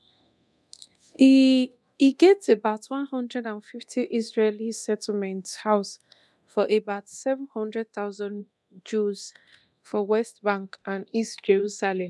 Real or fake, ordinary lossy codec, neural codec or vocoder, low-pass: fake; none; codec, 24 kHz, 0.9 kbps, DualCodec; none